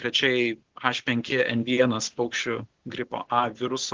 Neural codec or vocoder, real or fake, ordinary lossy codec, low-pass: none; real; Opus, 16 kbps; 7.2 kHz